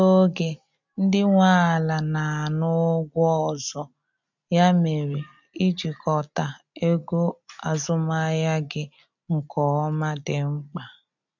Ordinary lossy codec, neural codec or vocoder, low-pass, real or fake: none; none; 7.2 kHz; real